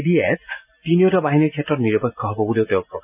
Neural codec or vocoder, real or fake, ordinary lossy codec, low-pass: none; real; none; 3.6 kHz